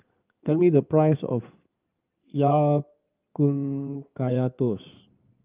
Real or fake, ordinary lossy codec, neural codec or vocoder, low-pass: fake; Opus, 24 kbps; codec, 16 kHz in and 24 kHz out, 2.2 kbps, FireRedTTS-2 codec; 3.6 kHz